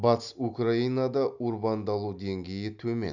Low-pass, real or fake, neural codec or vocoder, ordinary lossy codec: 7.2 kHz; real; none; none